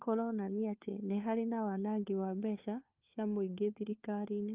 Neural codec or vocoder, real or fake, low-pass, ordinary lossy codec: codec, 16 kHz, 4 kbps, FunCodec, trained on LibriTTS, 50 frames a second; fake; 3.6 kHz; Opus, 24 kbps